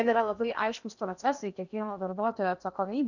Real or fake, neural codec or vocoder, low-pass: fake; codec, 16 kHz in and 24 kHz out, 0.8 kbps, FocalCodec, streaming, 65536 codes; 7.2 kHz